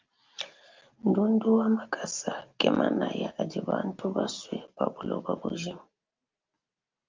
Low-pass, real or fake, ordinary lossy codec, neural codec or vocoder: 7.2 kHz; real; Opus, 24 kbps; none